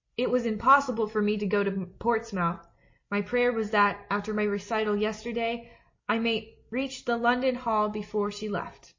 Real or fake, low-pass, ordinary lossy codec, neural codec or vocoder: real; 7.2 kHz; MP3, 32 kbps; none